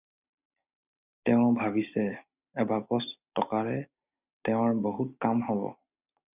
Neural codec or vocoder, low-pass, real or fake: none; 3.6 kHz; real